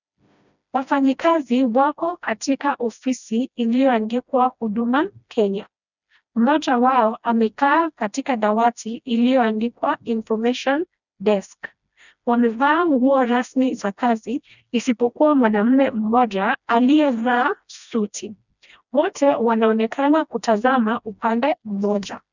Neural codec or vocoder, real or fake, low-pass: codec, 16 kHz, 1 kbps, FreqCodec, smaller model; fake; 7.2 kHz